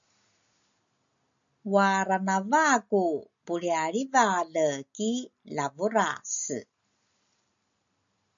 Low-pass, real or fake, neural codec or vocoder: 7.2 kHz; real; none